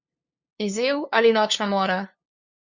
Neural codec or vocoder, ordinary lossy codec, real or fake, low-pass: codec, 16 kHz, 2 kbps, FunCodec, trained on LibriTTS, 25 frames a second; Opus, 64 kbps; fake; 7.2 kHz